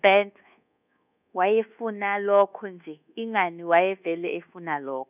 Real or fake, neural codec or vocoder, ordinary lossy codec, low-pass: fake; codec, 24 kHz, 1.2 kbps, DualCodec; none; 3.6 kHz